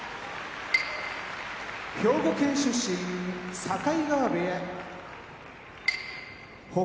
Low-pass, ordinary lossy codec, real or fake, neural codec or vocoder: none; none; real; none